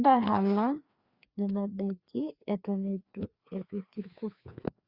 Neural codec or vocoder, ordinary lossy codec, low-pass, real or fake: codec, 16 kHz, 2 kbps, FreqCodec, larger model; Opus, 64 kbps; 5.4 kHz; fake